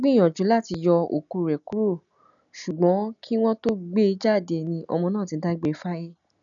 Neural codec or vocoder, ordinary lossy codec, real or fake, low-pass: none; none; real; 7.2 kHz